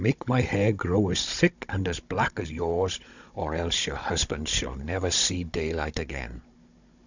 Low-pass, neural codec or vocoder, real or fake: 7.2 kHz; codec, 16 kHz, 16 kbps, FunCodec, trained on Chinese and English, 50 frames a second; fake